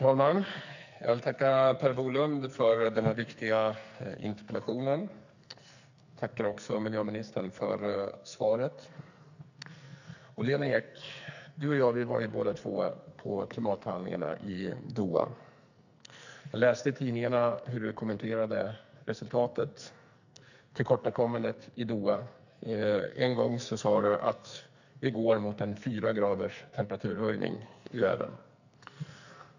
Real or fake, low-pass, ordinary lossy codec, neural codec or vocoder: fake; 7.2 kHz; none; codec, 44.1 kHz, 2.6 kbps, SNAC